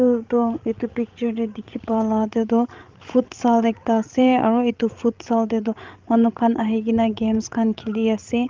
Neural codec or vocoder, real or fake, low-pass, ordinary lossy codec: codec, 16 kHz, 16 kbps, FreqCodec, larger model; fake; 7.2 kHz; Opus, 32 kbps